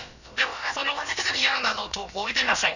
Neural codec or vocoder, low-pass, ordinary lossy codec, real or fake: codec, 16 kHz, about 1 kbps, DyCAST, with the encoder's durations; 7.2 kHz; none; fake